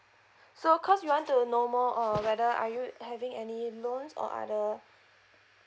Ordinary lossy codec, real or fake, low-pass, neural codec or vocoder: none; real; none; none